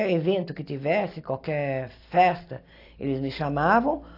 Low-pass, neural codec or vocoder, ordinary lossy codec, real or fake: 5.4 kHz; none; AAC, 32 kbps; real